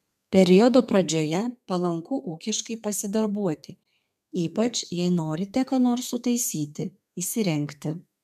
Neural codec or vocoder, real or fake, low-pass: codec, 32 kHz, 1.9 kbps, SNAC; fake; 14.4 kHz